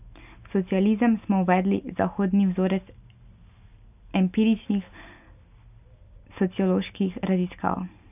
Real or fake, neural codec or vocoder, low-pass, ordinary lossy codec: real; none; 3.6 kHz; none